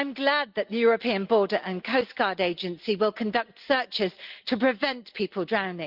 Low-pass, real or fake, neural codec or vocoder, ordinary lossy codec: 5.4 kHz; real; none; Opus, 32 kbps